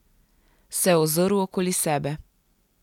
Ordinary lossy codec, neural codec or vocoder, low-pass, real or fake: none; none; 19.8 kHz; real